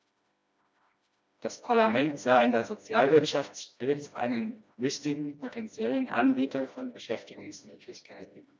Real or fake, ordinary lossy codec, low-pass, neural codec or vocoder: fake; none; none; codec, 16 kHz, 1 kbps, FreqCodec, smaller model